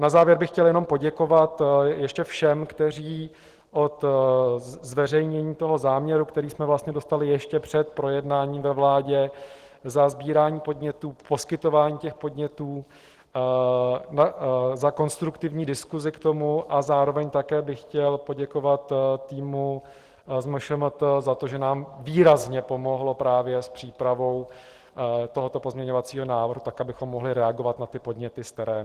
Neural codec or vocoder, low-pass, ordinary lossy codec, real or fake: none; 14.4 kHz; Opus, 16 kbps; real